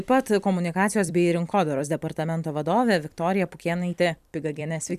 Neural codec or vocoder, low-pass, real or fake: vocoder, 44.1 kHz, 128 mel bands every 256 samples, BigVGAN v2; 14.4 kHz; fake